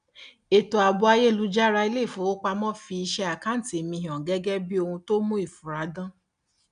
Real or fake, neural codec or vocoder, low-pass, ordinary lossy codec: real; none; 9.9 kHz; none